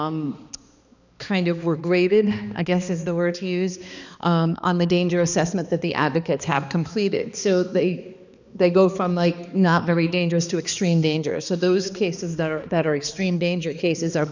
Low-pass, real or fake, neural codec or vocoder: 7.2 kHz; fake; codec, 16 kHz, 2 kbps, X-Codec, HuBERT features, trained on balanced general audio